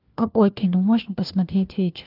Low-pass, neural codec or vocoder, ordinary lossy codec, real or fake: 5.4 kHz; codec, 16 kHz, 1 kbps, FunCodec, trained on LibriTTS, 50 frames a second; Opus, 32 kbps; fake